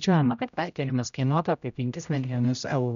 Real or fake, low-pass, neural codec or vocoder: fake; 7.2 kHz; codec, 16 kHz, 0.5 kbps, X-Codec, HuBERT features, trained on general audio